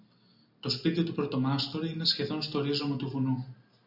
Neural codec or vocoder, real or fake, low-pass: none; real; 5.4 kHz